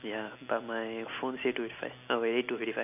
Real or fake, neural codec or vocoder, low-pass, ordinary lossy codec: real; none; 3.6 kHz; none